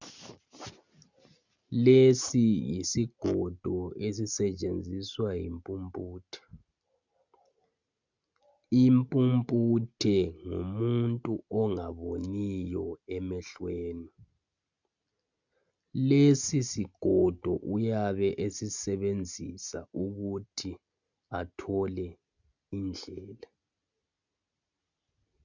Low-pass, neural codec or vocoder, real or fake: 7.2 kHz; none; real